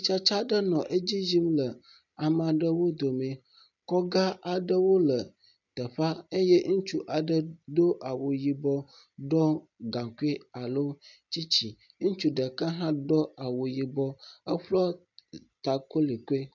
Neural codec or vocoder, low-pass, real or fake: none; 7.2 kHz; real